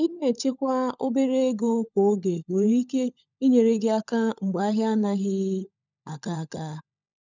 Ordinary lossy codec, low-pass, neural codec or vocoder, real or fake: none; 7.2 kHz; codec, 16 kHz, 16 kbps, FunCodec, trained on LibriTTS, 50 frames a second; fake